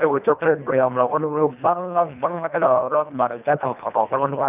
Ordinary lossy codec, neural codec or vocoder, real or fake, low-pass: none; codec, 24 kHz, 1.5 kbps, HILCodec; fake; 3.6 kHz